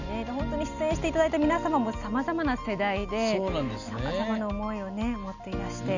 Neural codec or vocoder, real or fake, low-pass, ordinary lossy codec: none; real; 7.2 kHz; none